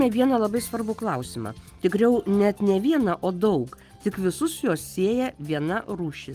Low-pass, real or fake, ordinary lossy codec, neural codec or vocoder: 14.4 kHz; real; Opus, 32 kbps; none